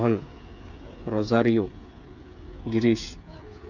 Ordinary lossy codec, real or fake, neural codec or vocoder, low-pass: none; fake; codec, 16 kHz, 8 kbps, FreqCodec, smaller model; 7.2 kHz